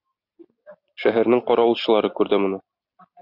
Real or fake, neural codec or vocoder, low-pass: real; none; 5.4 kHz